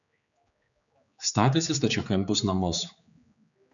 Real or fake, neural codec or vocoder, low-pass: fake; codec, 16 kHz, 4 kbps, X-Codec, HuBERT features, trained on general audio; 7.2 kHz